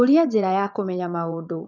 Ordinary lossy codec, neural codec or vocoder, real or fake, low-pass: none; vocoder, 22.05 kHz, 80 mel bands, WaveNeXt; fake; 7.2 kHz